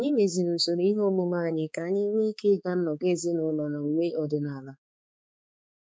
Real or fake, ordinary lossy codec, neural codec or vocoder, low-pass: fake; none; codec, 16 kHz, 4 kbps, X-Codec, HuBERT features, trained on balanced general audio; none